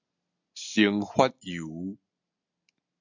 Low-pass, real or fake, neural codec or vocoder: 7.2 kHz; real; none